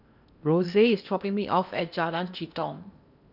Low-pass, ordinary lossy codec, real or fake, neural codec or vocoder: 5.4 kHz; none; fake; codec, 16 kHz in and 24 kHz out, 0.8 kbps, FocalCodec, streaming, 65536 codes